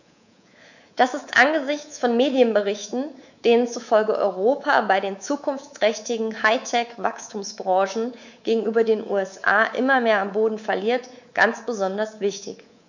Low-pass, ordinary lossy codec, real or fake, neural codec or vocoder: 7.2 kHz; none; fake; codec, 24 kHz, 3.1 kbps, DualCodec